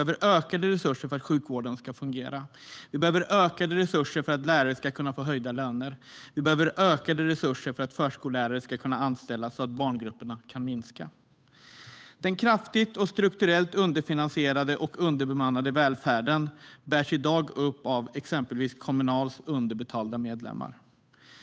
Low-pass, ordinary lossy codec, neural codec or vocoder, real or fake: none; none; codec, 16 kHz, 8 kbps, FunCodec, trained on Chinese and English, 25 frames a second; fake